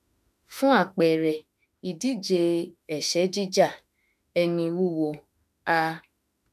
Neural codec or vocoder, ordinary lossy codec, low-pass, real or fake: autoencoder, 48 kHz, 32 numbers a frame, DAC-VAE, trained on Japanese speech; none; 14.4 kHz; fake